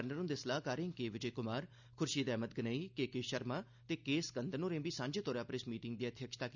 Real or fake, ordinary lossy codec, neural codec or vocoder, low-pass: real; none; none; 7.2 kHz